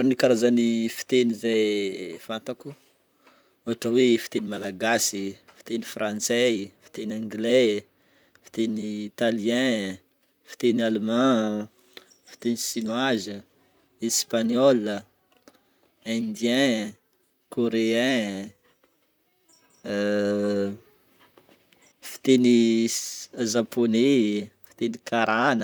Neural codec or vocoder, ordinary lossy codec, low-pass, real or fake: vocoder, 44.1 kHz, 128 mel bands, Pupu-Vocoder; none; none; fake